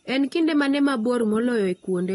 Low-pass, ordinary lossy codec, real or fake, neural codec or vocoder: 10.8 kHz; AAC, 32 kbps; real; none